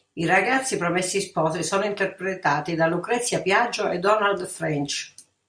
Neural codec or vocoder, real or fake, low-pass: none; real; 9.9 kHz